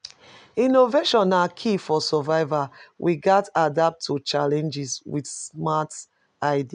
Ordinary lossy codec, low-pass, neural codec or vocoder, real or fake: none; 9.9 kHz; none; real